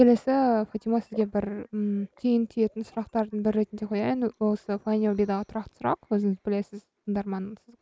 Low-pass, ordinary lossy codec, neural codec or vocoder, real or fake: none; none; none; real